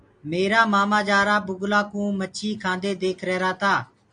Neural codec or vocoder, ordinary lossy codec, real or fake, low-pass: none; AAC, 64 kbps; real; 9.9 kHz